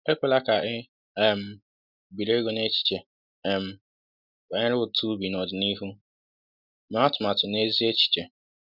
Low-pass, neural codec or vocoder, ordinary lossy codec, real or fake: 5.4 kHz; none; none; real